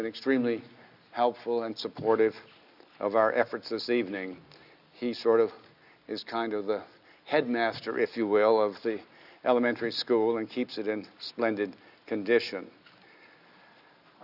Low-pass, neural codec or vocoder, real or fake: 5.4 kHz; none; real